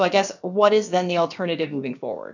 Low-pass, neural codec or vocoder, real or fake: 7.2 kHz; codec, 16 kHz, about 1 kbps, DyCAST, with the encoder's durations; fake